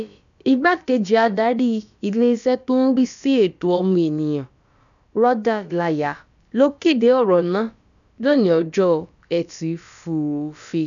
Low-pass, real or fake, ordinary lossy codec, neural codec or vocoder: 7.2 kHz; fake; none; codec, 16 kHz, about 1 kbps, DyCAST, with the encoder's durations